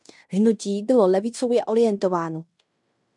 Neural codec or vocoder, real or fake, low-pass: codec, 16 kHz in and 24 kHz out, 0.9 kbps, LongCat-Audio-Codec, fine tuned four codebook decoder; fake; 10.8 kHz